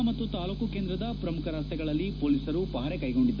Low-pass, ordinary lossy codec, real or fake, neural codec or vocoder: 7.2 kHz; none; real; none